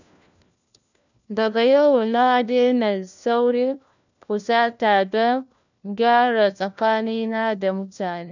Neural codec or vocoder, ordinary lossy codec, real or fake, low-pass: codec, 16 kHz, 1 kbps, FunCodec, trained on LibriTTS, 50 frames a second; none; fake; 7.2 kHz